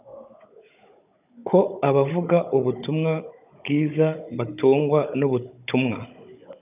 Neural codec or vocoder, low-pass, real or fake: codec, 16 kHz, 16 kbps, FunCodec, trained on Chinese and English, 50 frames a second; 3.6 kHz; fake